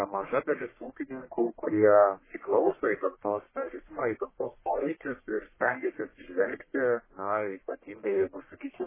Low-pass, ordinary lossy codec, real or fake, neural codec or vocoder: 3.6 kHz; MP3, 16 kbps; fake; codec, 44.1 kHz, 1.7 kbps, Pupu-Codec